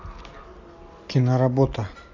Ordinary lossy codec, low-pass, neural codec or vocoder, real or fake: AAC, 48 kbps; 7.2 kHz; none; real